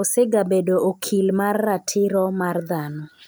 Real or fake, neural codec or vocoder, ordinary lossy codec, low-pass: real; none; none; none